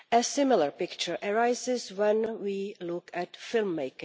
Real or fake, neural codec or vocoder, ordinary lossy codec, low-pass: real; none; none; none